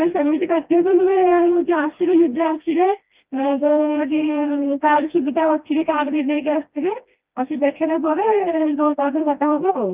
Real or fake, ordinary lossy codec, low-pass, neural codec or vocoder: fake; Opus, 24 kbps; 3.6 kHz; codec, 16 kHz, 1 kbps, FreqCodec, smaller model